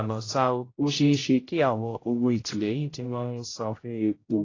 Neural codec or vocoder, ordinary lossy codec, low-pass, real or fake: codec, 16 kHz, 0.5 kbps, X-Codec, HuBERT features, trained on general audio; AAC, 32 kbps; 7.2 kHz; fake